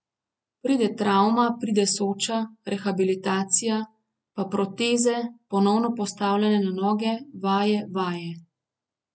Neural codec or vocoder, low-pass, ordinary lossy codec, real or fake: none; none; none; real